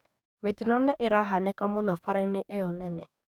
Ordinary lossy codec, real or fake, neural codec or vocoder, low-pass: none; fake; codec, 44.1 kHz, 2.6 kbps, DAC; 19.8 kHz